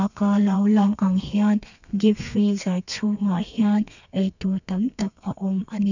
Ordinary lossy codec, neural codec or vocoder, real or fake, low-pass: none; codec, 32 kHz, 1.9 kbps, SNAC; fake; 7.2 kHz